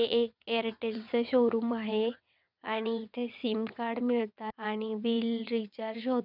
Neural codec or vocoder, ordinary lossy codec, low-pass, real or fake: vocoder, 22.05 kHz, 80 mel bands, WaveNeXt; none; 5.4 kHz; fake